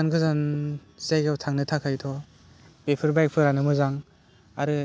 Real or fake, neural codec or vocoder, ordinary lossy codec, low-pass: real; none; none; none